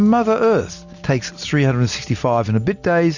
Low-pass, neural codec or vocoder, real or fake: 7.2 kHz; none; real